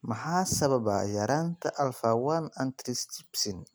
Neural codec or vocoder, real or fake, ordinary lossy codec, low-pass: none; real; none; none